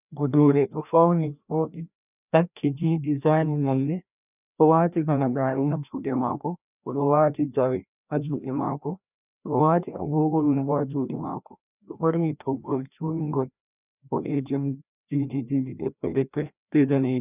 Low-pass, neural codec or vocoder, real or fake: 3.6 kHz; codec, 16 kHz, 1 kbps, FreqCodec, larger model; fake